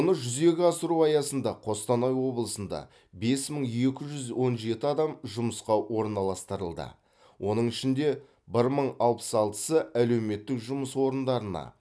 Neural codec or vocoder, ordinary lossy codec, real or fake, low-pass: none; none; real; none